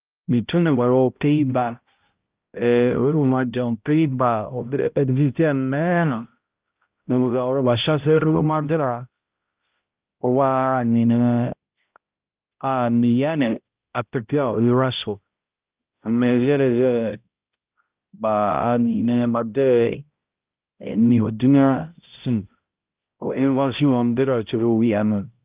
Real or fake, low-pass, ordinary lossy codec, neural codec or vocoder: fake; 3.6 kHz; Opus, 32 kbps; codec, 16 kHz, 0.5 kbps, X-Codec, HuBERT features, trained on balanced general audio